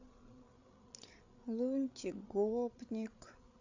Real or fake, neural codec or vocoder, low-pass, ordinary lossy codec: fake; codec, 16 kHz, 8 kbps, FreqCodec, larger model; 7.2 kHz; AAC, 48 kbps